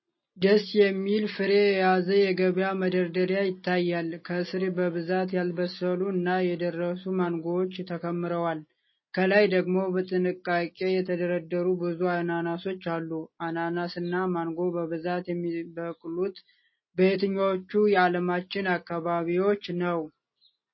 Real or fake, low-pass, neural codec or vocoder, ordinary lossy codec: real; 7.2 kHz; none; MP3, 24 kbps